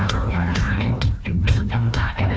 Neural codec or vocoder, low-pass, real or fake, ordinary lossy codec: codec, 16 kHz, 1 kbps, FunCodec, trained on Chinese and English, 50 frames a second; none; fake; none